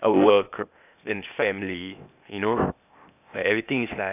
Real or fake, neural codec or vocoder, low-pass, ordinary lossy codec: fake; codec, 16 kHz, 0.8 kbps, ZipCodec; 3.6 kHz; none